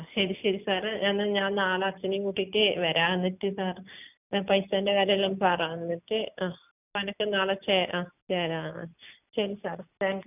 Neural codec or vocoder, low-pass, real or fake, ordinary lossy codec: none; 3.6 kHz; real; none